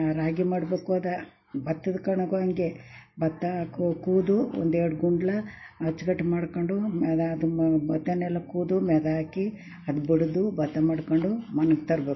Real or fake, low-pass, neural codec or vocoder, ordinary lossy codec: real; 7.2 kHz; none; MP3, 24 kbps